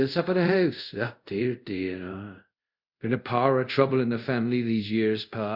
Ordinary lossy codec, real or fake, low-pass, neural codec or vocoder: Opus, 64 kbps; fake; 5.4 kHz; codec, 24 kHz, 0.5 kbps, DualCodec